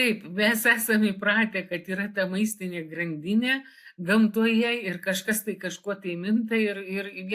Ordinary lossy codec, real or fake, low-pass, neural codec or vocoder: AAC, 64 kbps; real; 14.4 kHz; none